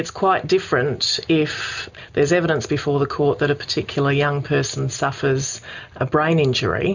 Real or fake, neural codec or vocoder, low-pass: real; none; 7.2 kHz